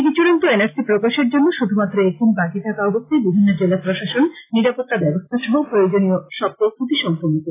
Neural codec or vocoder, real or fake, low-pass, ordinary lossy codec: none; real; 3.6 kHz; AAC, 16 kbps